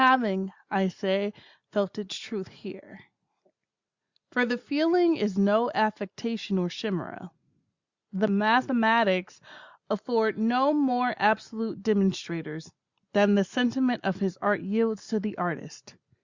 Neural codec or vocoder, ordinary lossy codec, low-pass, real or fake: none; Opus, 64 kbps; 7.2 kHz; real